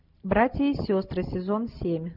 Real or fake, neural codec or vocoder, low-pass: real; none; 5.4 kHz